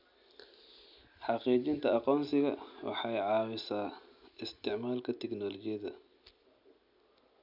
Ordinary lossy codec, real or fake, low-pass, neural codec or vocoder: none; real; 5.4 kHz; none